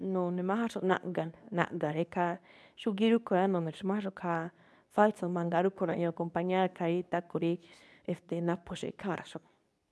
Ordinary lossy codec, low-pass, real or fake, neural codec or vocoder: none; none; fake; codec, 24 kHz, 0.9 kbps, WavTokenizer, medium speech release version 2